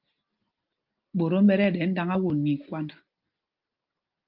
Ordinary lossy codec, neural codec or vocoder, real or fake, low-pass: Opus, 32 kbps; none; real; 5.4 kHz